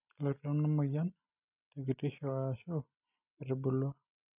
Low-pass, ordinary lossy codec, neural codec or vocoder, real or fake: 3.6 kHz; none; none; real